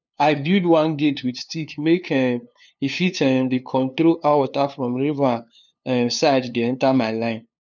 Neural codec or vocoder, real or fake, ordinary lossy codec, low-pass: codec, 16 kHz, 2 kbps, FunCodec, trained on LibriTTS, 25 frames a second; fake; none; 7.2 kHz